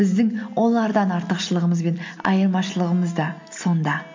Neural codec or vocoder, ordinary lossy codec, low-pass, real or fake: none; MP3, 48 kbps; 7.2 kHz; real